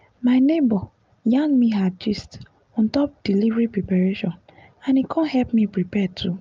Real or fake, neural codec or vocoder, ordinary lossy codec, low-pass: real; none; Opus, 24 kbps; 7.2 kHz